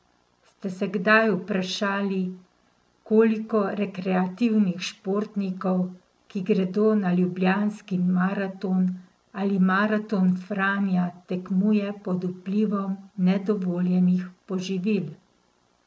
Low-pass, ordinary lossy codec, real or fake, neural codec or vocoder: none; none; real; none